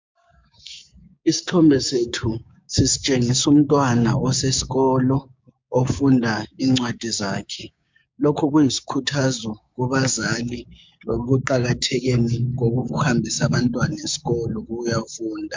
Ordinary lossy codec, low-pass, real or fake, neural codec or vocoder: MP3, 64 kbps; 7.2 kHz; fake; codec, 16 kHz, 6 kbps, DAC